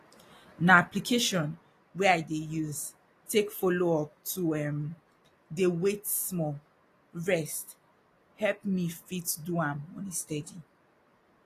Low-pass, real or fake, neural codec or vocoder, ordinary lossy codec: 14.4 kHz; real; none; AAC, 48 kbps